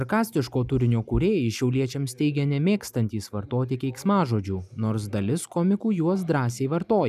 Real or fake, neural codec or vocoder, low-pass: real; none; 14.4 kHz